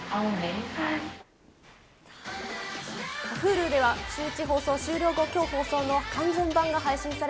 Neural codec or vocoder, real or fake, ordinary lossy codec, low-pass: none; real; none; none